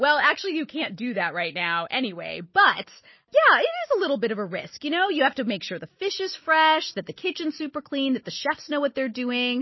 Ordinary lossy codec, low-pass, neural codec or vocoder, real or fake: MP3, 24 kbps; 7.2 kHz; none; real